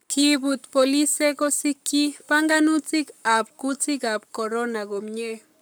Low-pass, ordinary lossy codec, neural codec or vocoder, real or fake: none; none; codec, 44.1 kHz, 7.8 kbps, Pupu-Codec; fake